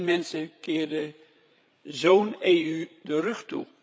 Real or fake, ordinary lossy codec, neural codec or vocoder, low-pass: fake; none; codec, 16 kHz, 8 kbps, FreqCodec, larger model; none